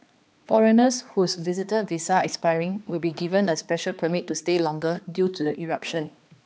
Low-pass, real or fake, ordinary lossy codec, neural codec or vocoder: none; fake; none; codec, 16 kHz, 2 kbps, X-Codec, HuBERT features, trained on balanced general audio